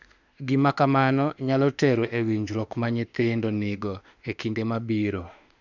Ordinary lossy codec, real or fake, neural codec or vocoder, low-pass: none; fake; autoencoder, 48 kHz, 32 numbers a frame, DAC-VAE, trained on Japanese speech; 7.2 kHz